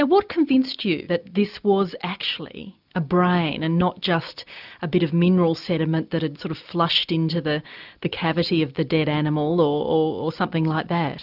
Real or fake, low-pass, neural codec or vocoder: real; 5.4 kHz; none